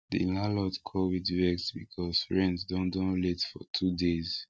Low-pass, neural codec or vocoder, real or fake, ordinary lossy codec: none; none; real; none